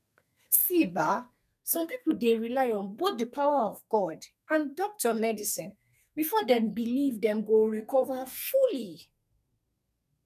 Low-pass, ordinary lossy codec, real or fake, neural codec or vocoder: 14.4 kHz; none; fake; codec, 32 kHz, 1.9 kbps, SNAC